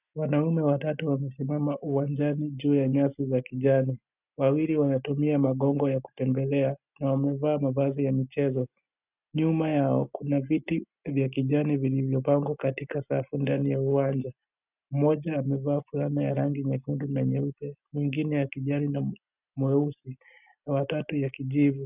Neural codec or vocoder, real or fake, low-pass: none; real; 3.6 kHz